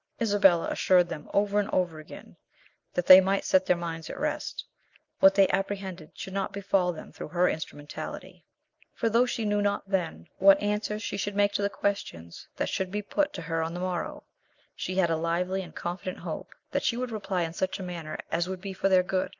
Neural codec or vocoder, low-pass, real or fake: none; 7.2 kHz; real